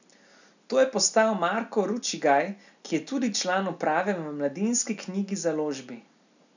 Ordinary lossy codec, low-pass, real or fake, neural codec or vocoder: none; 7.2 kHz; real; none